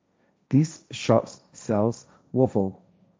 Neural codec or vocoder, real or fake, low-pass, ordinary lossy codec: codec, 16 kHz, 1.1 kbps, Voila-Tokenizer; fake; none; none